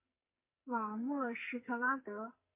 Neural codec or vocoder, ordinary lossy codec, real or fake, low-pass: codec, 44.1 kHz, 2.6 kbps, SNAC; MP3, 24 kbps; fake; 3.6 kHz